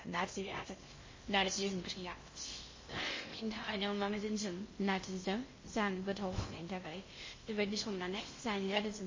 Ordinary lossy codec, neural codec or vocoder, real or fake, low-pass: MP3, 32 kbps; codec, 16 kHz in and 24 kHz out, 0.6 kbps, FocalCodec, streaming, 2048 codes; fake; 7.2 kHz